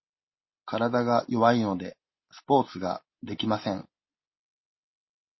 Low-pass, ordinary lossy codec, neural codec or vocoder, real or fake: 7.2 kHz; MP3, 24 kbps; none; real